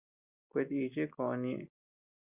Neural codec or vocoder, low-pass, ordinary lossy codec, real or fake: none; 3.6 kHz; Opus, 64 kbps; real